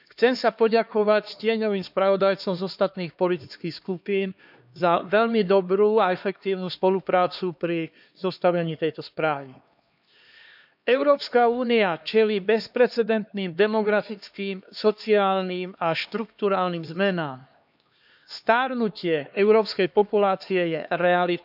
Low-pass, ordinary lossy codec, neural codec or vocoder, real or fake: 5.4 kHz; none; codec, 16 kHz, 2 kbps, X-Codec, HuBERT features, trained on LibriSpeech; fake